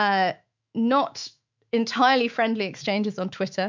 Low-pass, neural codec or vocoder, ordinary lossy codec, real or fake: 7.2 kHz; autoencoder, 48 kHz, 128 numbers a frame, DAC-VAE, trained on Japanese speech; MP3, 64 kbps; fake